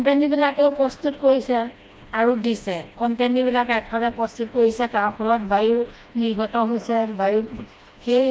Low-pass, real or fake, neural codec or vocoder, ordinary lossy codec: none; fake; codec, 16 kHz, 1 kbps, FreqCodec, smaller model; none